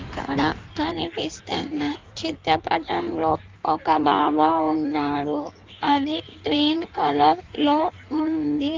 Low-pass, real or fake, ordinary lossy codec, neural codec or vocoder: 7.2 kHz; fake; Opus, 24 kbps; codec, 16 kHz in and 24 kHz out, 1.1 kbps, FireRedTTS-2 codec